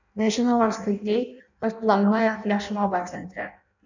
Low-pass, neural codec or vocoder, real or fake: 7.2 kHz; codec, 16 kHz in and 24 kHz out, 0.6 kbps, FireRedTTS-2 codec; fake